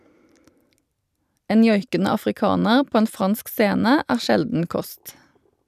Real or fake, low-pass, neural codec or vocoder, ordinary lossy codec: fake; 14.4 kHz; vocoder, 44.1 kHz, 128 mel bands every 256 samples, BigVGAN v2; none